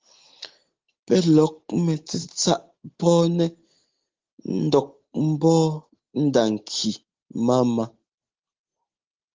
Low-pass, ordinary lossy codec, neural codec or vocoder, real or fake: 7.2 kHz; Opus, 16 kbps; none; real